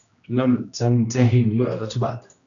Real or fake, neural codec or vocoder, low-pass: fake; codec, 16 kHz, 1 kbps, X-Codec, HuBERT features, trained on general audio; 7.2 kHz